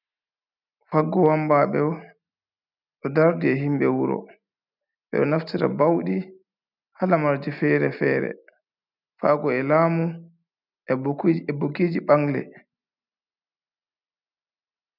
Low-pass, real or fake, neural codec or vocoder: 5.4 kHz; real; none